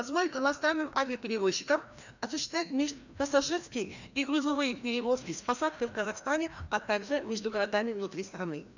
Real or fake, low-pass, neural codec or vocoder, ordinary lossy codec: fake; 7.2 kHz; codec, 16 kHz, 1 kbps, FreqCodec, larger model; none